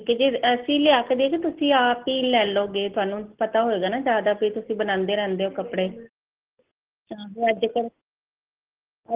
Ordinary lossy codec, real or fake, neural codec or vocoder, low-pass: Opus, 16 kbps; real; none; 3.6 kHz